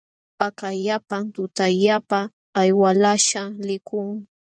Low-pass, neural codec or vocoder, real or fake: 9.9 kHz; vocoder, 24 kHz, 100 mel bands, Vocos; fake